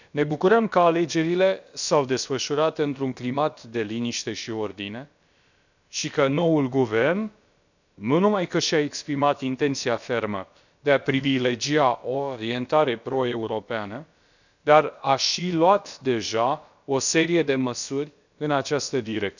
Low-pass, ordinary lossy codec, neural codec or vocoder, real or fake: 7.2 kHz; none; codec, 16 kHz, about 1 kbps, DyCAST, with the encoder's durations; fake